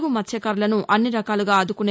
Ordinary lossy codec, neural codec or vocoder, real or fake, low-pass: none; none; real; none